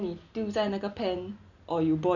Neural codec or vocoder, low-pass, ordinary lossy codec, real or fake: none; 7.2 kHz; none; real